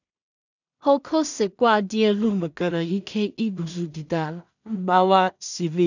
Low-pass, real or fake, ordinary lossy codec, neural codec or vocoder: 7.2 kHz; fake; none; codec, 16 kHz in and 24 kHz out, 0.4 kbps, LongCat-Audio-Codec, two codebook decoder